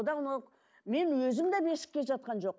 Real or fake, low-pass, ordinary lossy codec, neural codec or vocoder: real; none; none; none